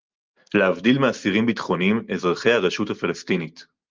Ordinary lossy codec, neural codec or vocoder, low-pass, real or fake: Opus, 32 kbps; none; 7.2 kHz; real